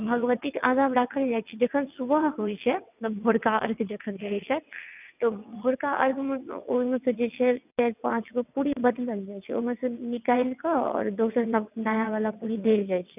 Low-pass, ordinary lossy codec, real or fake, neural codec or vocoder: 3.6 kHz; none; fake; vocoder, 22.05 kHz, 80 mel bands, WaveNeXt